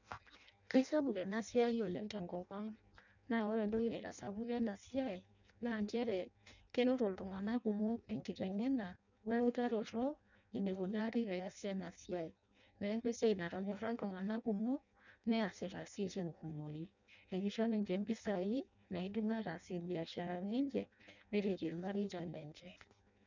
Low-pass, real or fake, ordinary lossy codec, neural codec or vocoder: 7.2 kHz; fake; none; codec, 16 kHz in and 24 kHz out, 0.6 kbps, FireRedTTS-2 codec